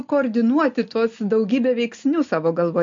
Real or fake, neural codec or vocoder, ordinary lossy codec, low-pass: real; none; MP3, 48 kbps; 7.2 kHz